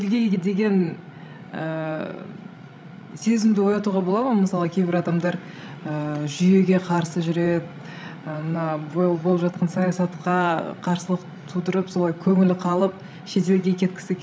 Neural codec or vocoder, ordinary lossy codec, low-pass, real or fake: codec, 16 kHz, 16 kbps, FreqCodec, larger model; none; none; fake